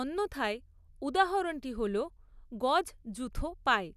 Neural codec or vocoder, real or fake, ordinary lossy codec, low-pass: none; real; none; 14.4 kHz